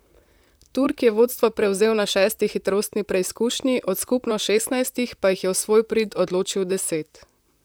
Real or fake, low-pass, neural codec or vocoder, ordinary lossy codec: fake; none; vocoder, 44.1 kHz, 128 mel bands, Pupu-Vocoder; none